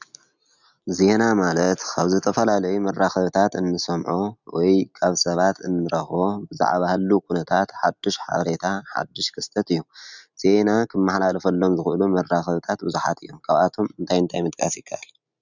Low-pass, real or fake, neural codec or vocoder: 7.2 kHz; real; none